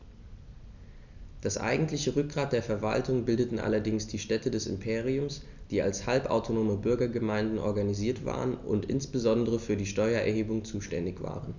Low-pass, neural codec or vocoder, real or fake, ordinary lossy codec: 7.2 kHz; none; real; none